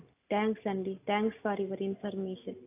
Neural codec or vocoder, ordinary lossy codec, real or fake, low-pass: none; AAC, 24 kbps; real; 3.6 kHz